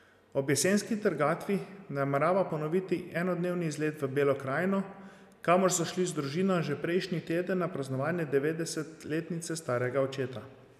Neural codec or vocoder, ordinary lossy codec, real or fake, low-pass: none; none; real; 14.4 kHz